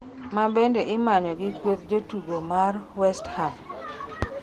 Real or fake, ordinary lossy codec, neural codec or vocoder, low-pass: real; Opus, 16 kbps; none; 14.4 kHz